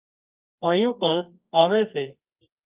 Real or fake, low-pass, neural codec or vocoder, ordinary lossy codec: fake; 3.6 kHz; codec, 24 kHz, 0.9 kbps, WavTokenizer, medium music audio release; Opus, 32 kbps